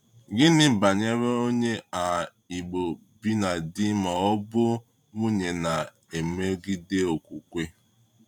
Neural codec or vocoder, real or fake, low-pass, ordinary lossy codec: vocoder, 44.1 kHz, 128 mel bands every 512 samples, BigVGAN v2; fake; 19.8 kHz; none